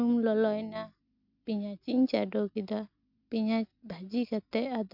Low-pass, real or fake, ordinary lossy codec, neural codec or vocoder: 5.4 kHz; real; none; none